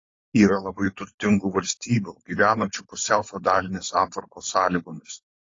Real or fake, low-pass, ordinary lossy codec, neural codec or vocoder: fake; 7.2 kHz; AAC, 32 kbps; codec, 16 kHz, 4.8 kbps, FACodec